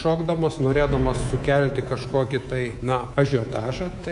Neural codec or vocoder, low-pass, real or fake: codec, 24 kHz, 3.1 kbps, DualCodec; 10.8 kHz; fake